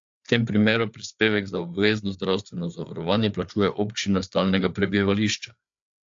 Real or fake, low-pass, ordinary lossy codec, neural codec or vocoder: fake; 7.2 kHz; AAC, 64 kbps; codec, 16 kHz, 4 kbps, FreqCodec, larger model